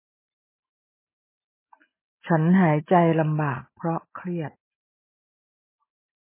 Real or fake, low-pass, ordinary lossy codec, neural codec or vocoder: real; 3.6 kHz; MP3, 16 kbps; none